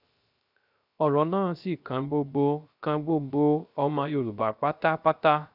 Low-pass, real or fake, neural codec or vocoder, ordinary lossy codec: 5.4 kHz; fake; codec, 16 kHz, 0.3 kbps, FocalCodec; none